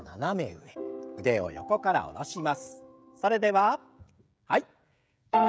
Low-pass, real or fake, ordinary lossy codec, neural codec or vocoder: none; fake; none; codec, 16 kHz, 16 kbps, FreqCodec, smaller model